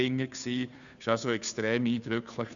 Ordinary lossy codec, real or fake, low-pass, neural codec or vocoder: none; fake; 7.2 kHz; codec, 16 kHz, 2 kbps, FunCodec, trained on Chinese and English, 25 frames a second